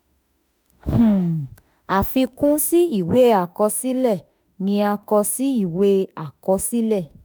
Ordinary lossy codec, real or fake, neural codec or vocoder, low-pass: none; fake; autoencoder, 48 kHz, 32 numbers a frame, DAC-VAE, trained on Japanese speech; none